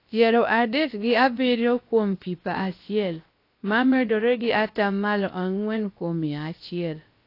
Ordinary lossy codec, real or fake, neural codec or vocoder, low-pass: AAC, 32 kbps; fake; codec, 16 kHz, about 1 kbps, DyCAST, with the encoder's durations; 5.4 kHz